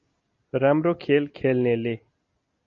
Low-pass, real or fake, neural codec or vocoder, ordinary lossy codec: 7.2 kHz; real; none; MP3, 48 kbps